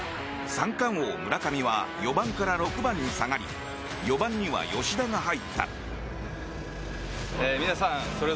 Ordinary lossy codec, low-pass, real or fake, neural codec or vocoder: none; none; real; none